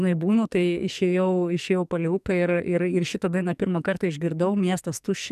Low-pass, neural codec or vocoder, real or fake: 14.4 kHz; codec, 44.1 kHz, 2.6 kbps, SNAC; fake